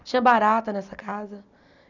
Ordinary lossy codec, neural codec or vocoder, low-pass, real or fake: none; none; 7.2 kHz; real